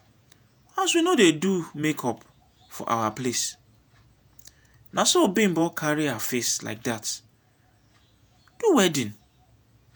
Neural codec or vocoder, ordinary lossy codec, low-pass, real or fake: vocoder, 48 kHz, 128 mel bands, Vocos; none; none; fake